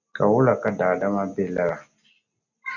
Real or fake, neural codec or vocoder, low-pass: real; none; 7.2 kHz